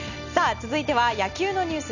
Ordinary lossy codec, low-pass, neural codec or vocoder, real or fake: none; 7.2 kHz; none; real